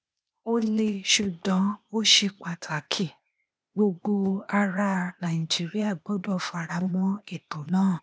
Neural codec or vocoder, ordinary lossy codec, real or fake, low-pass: codec, 16 kHz, 0.8 kbps, ZipCodec; none; fake; none